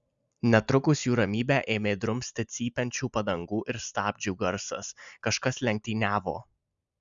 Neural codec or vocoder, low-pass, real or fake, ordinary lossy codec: none; 7.2 kHz; real; Opus, 64 kbps